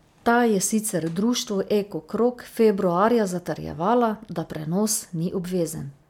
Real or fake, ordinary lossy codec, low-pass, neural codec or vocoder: real; MP3, 96 kbps; 19.8 kHz; none